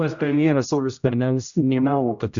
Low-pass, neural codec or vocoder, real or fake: 7.2 kHz; codec, 16 kHz, 0.5 kbps, X-Codec, HuBERT features, trained on general audio; fake